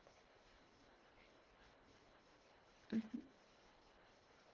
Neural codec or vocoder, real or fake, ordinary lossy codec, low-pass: codec, 24 kHz, 1.5 kbps, HILCodec; fake; Opus, 16 kbps; 7.2 kHz